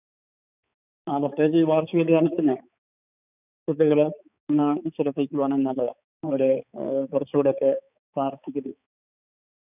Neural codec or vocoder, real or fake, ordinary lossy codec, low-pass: codec, 16 kHz, 4 kbps, X-Codec, HuBERT features, trained on balanced general audio; fake; none; 3.6 kHz